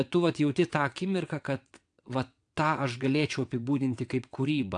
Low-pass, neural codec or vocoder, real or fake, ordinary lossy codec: 9.9 kHz; vocoder, 22.05 kHz, 80 mel bands, WaveNeXt; fake; AAC, 64 kbps